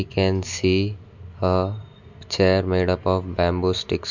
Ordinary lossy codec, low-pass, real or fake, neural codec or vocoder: none; 7.2 kHz; real; none